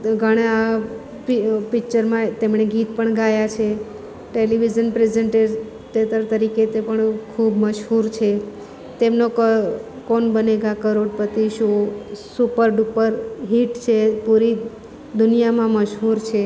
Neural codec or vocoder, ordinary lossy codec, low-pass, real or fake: none; none; none; real